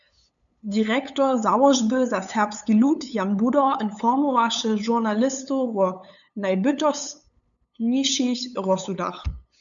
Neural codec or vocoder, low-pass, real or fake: codec, 16 kHz, 8 kbps, FunCodec, trained on LibriTTS, 25 frames a second; 7.2 kHz; fake